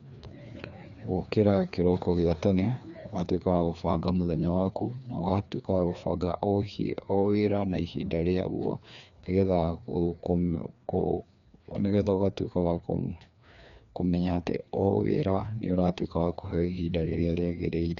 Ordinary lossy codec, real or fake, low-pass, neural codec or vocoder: none; fake; 7.2 kHz; codec, 16 kHz, 2 kbps, FreqCodec, larger model